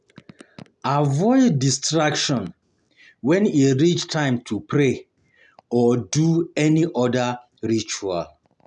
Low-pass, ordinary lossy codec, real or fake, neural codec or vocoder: 10.8 kHz; none; real; none